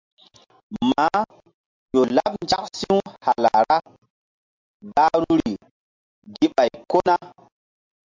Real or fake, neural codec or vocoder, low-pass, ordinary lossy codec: real; none; 7.2 kHz; MP3, 64 kbps